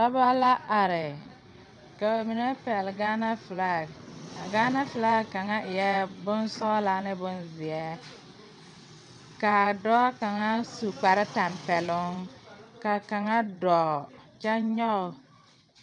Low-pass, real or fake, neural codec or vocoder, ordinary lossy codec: 9.9 kHz; fake; vocoder, 22.05 kHz, 80 mel bands, WaveNeXt; MP3, 96 kbps